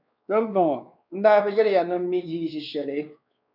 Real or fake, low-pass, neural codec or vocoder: fake; 5.4 kHz; codec, 16 kHz, 2 kbps, X-Codec, WavLM features, trained on Multilingual LibriSpeech